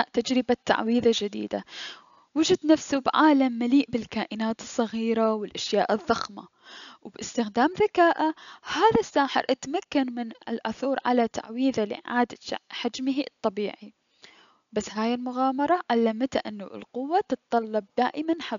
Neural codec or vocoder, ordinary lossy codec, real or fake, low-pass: none; none; real; 7.2 kHz